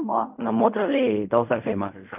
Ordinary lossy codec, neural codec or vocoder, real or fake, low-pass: none; codec, 16 kHz in and 24 kHz out, 0.4 kbps, LongCat-Audio-Codec, fine tuned four codebook decoder; fake; 3.6 kHz